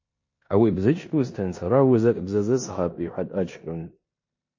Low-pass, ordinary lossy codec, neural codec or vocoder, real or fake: 7.2 kHz; MP3, 32 kbps; codec, 16 kHz in and 24 kHz out, 0.9 kbps, LongCat-Audio-Codec, four codebook decoder; fake